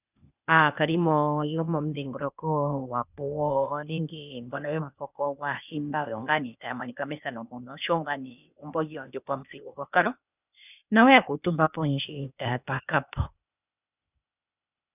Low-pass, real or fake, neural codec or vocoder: 3.6 kHz; fake; codec, 16 kHz, 0.8 kbps, ZipCodec